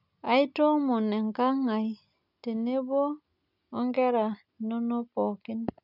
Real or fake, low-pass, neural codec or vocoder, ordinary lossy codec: real; 5.4 kHz; none; none